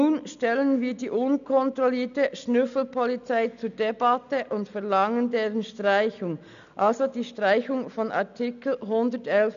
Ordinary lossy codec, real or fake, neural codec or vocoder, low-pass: none; real; none; 7.2 kHz